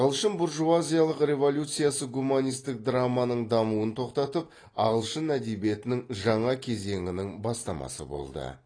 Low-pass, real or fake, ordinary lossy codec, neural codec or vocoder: 9.9 kHz; real; AAC, 32 kbps; none